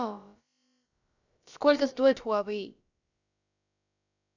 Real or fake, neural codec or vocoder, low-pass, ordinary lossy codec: fake; codec, 16 kHz, about 1 kbps, DyCAST, with the encoder's durations; 7.2 kHz; none